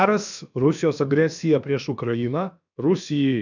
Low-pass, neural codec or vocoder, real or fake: 7.2 kHz; codec, 16 kHz, about 1 kbps, DyCAST, with the encoder's durations; fake